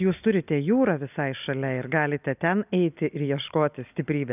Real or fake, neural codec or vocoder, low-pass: real; none; 3.6 kHz